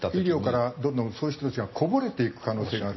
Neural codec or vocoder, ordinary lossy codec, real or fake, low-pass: none; MP3, 24 kbps; real; 7.2 kHz